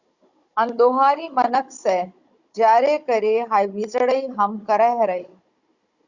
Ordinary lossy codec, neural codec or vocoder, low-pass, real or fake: Opus, 64 kbps; codec, 16 kHz, 16 kbps, FunCodec, trained on Chinese and English, 50 frames a second; 7.2 kHz; fake